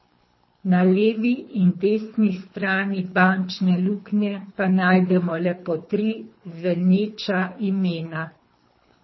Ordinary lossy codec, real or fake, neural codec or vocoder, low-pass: MP3, 24 kbps; fake; codec, 24 kHz, 3 kbps, HILCodec; 7.2 kHz